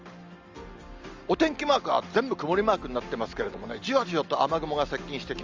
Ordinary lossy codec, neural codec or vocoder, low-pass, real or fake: Opus, 32 kbps; none; 7.2 kHz; real